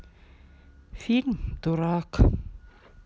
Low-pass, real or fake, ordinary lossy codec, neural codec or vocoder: none; real; none; none